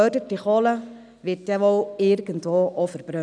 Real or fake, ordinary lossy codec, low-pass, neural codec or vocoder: real; none; 9.9 kHz; none